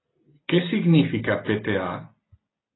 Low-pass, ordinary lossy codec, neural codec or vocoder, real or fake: 7.2 kHz; AAC, 16 kbps; none; real